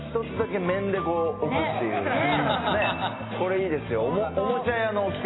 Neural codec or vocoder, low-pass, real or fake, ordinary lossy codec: none; 7.2 kHz; real; AAC, 16 kbps